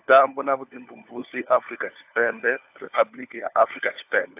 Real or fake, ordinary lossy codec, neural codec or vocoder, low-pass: fake; AAC, 32 kbps; codec, 16 kHz, 4 kbps, FunCodec, trained on LibriTTS, 50 frames a second; 3.6 kHz